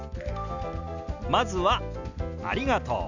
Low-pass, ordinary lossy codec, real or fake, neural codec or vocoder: 7.2 kHz; none; real; none